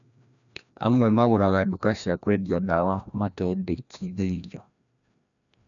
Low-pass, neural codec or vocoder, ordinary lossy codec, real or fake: 7.2 kHz; codec, 16 kHz, 1 kbps, FreqCodec, larger model; none; fake